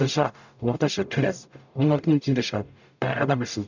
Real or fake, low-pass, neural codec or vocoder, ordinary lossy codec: fake; 7.2 kHz; codec, 44.1 kHz, 0.9 kbps, DAC; none